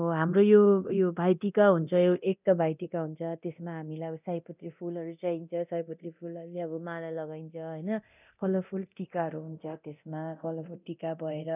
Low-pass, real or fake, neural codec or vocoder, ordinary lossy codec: 3.6 kHz; fake; codec, 24 kHz, 0.9 kbps, DualCodec; none